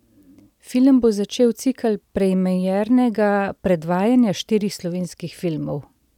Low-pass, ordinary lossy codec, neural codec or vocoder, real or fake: 19.8 kHz; none; none; real